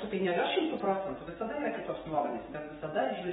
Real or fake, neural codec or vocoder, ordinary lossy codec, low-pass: fake; codec, 44.1 kHz, 7.8 kbps, DAC; AAC, 16 kbps; 19.8 kHz